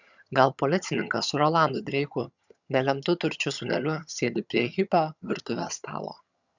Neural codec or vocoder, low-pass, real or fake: vocoder, 22.05 kHz, 80 mel bands, HiFi-GAN; 7.2 kHz; fake